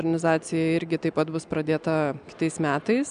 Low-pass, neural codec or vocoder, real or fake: 9.9 kHz; none; real